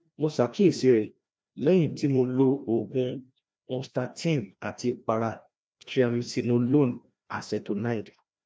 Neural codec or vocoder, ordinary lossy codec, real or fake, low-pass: codec, 16 kHz, 1 kbps, FreqCodec, larger model; none; fake; none